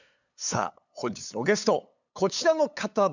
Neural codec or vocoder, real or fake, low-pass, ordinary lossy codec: codec, 16 kHz, 8 kbps, FunCodec, trained on LibriTTS, 25 frames a second; fake; 7.2 kHz; none